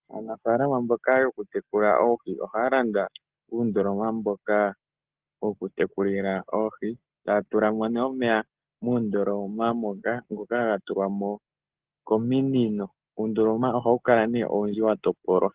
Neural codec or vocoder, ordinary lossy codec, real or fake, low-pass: none; Opus, 16 kbps; real; 3.6 kHz